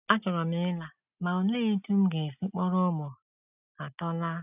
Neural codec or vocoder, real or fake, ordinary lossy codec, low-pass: none; real; none; 3.6 kHz